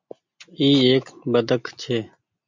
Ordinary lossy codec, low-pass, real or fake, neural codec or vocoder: MP3, 64 kbps; 7.2 kHz; real; none